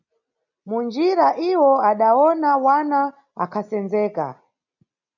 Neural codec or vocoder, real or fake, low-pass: none; real; 7.2 kHz